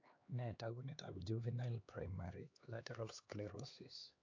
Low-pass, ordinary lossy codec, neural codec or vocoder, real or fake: 7.2 kHz; AAC, 48 kbps; codec, 16 kHz, 2 kbps, X-Codec, HuBERT features, trained on LibriSpeech; fake